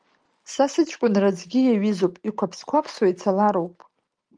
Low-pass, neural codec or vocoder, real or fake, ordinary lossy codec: 9.9 kHz; none; real; Opus, 32 kbps